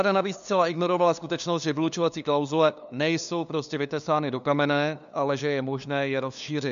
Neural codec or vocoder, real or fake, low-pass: codec, 16 kHz, 2 kbps, FunCodec, trained on LibriTTS, 25 frames a second; fake; 7.2 kHz